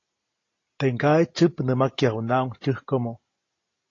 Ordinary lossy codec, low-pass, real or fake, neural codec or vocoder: AAC, 32 kbps; 7.2 kHz; real; none